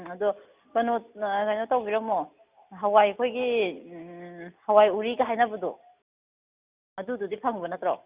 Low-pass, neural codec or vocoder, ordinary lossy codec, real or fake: 3.6 kHz; none; Opus, 64 kbps; real